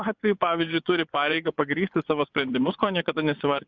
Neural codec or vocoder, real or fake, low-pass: vocoder, 24 kHz, 100 mel bands, Vocos; fake; 7.2 kHz